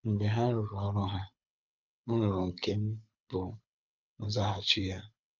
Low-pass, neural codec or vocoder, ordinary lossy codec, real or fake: 7.2 kHz; codec, 24 kHz, 6 kbps, HILCodec; none; fake